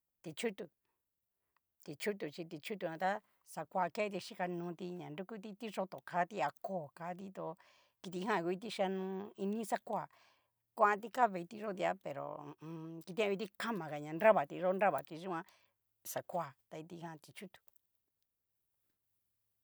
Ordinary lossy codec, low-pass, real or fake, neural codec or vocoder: none; none; real; none